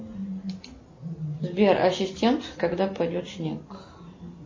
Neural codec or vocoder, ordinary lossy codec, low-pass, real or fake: none; MP3, 32 kbps; 7.2 kHz; real